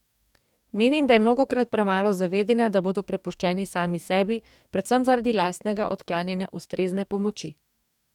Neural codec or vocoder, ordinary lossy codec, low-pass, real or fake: codec, 44.1 kHz, 2.6 kbps, DAC; none; 19.8 kHz; fake